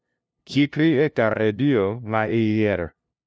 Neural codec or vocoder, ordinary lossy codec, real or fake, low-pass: codec, 16 kHz, 0.5 kbps, FunCodec, trained on LibriTTS, 25 frames a second; none; fake; none